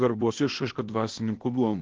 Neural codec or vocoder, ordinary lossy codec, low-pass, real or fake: codec, 16 kHz, 0.8 kbps, ZipCodec; Opus, 16 kbps; 7.2 kHz; fake